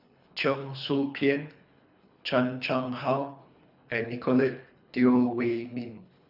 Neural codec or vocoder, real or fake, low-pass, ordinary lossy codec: codec, 24 kHz, 3 kbps, HILCodec; fake; 5.4 kHz; none